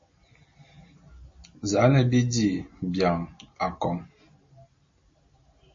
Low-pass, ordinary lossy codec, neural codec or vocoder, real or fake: 7.2 kHz; MP3, 32 kbps; none; real